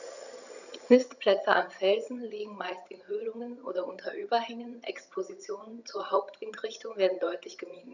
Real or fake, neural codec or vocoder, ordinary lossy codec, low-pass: fake; vocoder, 22.05 kHz, 80 mel bands, HiFi-GAN; none; 7.2 kHz